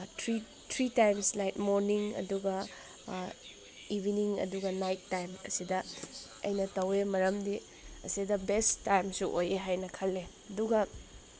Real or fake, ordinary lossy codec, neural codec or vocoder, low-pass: real; none; none; none